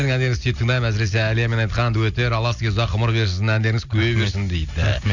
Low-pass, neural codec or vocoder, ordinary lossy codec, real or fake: 7.2 kHz; none; none; real